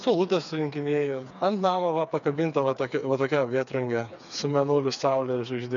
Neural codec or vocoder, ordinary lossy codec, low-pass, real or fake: codec, 16 kHz, 4 kbps, FreqCodec, smaller model; MP3, 96 kbps; 7.2 kHz; fake